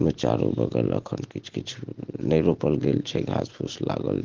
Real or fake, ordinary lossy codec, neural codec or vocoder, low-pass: real; Opus, 16 kbps; none; 7.2 kHz